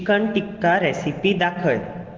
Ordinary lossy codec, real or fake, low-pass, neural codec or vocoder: Opus, 16 kbps; real; 7.2 kHz; none